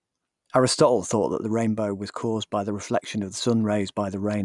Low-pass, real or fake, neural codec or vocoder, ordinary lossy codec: 10.8 kHz; real; none; none